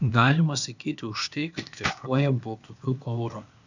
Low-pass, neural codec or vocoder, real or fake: 7.2 kHz; codec, 16 kHz, 0.8 kbps, ZipCodec; fake